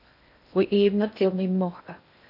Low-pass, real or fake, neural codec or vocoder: 5.4 kHz; fake; codec, 16 kHz in and 24 kHz out, 0.6 kbps, FocalCodec, streaming, 2048 codes